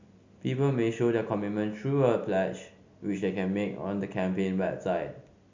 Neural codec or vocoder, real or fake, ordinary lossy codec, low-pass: none; real; MP3, 64 kbps; 7.2 kHz